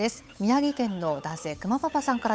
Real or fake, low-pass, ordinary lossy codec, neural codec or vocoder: fake; none; none; codec, 16 kHz, 8 kbps, FunCodec, trained on Chinese and English, 25 frames a second